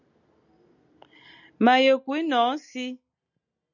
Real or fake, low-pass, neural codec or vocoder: real; 7.2 kHz; none